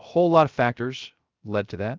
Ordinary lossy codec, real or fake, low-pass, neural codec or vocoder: Opus, 32 kbps; fake; 7.2 kHz; codec, 16 kHz, 0.3 kbps, FocalCodec